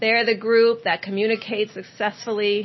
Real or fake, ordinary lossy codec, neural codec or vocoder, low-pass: real; MP3, 24 kbps; none; 7.2 kHz